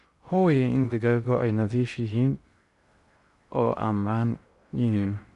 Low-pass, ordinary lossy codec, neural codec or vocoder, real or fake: 10.8 kHz; none; codec, 16 kHz in and 24 kHz out, 0.6 kbps, FocalCodec, streaming, 2048 codes; fake